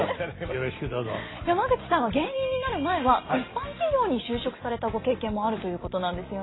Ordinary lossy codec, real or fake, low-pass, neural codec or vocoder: AAC, 16 kbps; real; 7.2 kHz; none